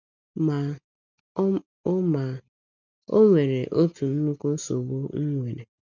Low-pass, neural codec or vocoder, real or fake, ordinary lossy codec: 7.2 kHz; none; real; none